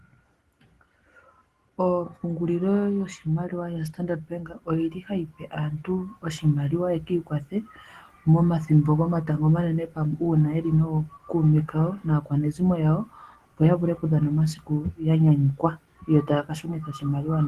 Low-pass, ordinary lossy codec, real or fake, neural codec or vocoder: 14.4 kHz; Opus, 16 kbps; real; none